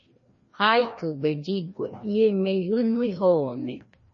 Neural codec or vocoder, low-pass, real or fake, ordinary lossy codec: codec, 16 kHz, 1 kbps, FreqCodec, larger model; 7.2 kHz; fake; MP3, 32 kbps